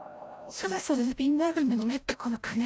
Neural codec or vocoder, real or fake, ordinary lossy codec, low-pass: codec, 16 kHz, 0.5 kbps, FreqCodec, larger model; fake; none; none